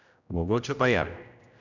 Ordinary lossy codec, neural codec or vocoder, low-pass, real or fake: none; codec, 16 kHz, 0.5 kbps, X-Codec, HuBERT features, trained on general audio; 7.2 kHz; fake